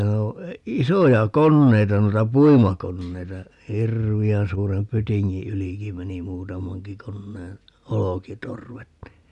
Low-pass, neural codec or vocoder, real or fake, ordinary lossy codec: 10.8 kHz; none; real; none